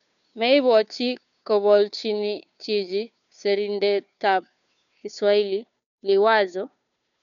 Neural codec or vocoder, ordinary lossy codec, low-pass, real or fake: codec, 16 kHz, 2 kbps, FunCodec, trained on Chinese and English, 25 frames a second; none; 7.2 kHz; fake